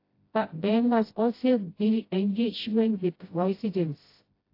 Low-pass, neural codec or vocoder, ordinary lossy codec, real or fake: 5.4 kHz; codec, 16 kHz, 0.5 kbps, FreqCodec, smaller model; AAC, 32 kbps; fake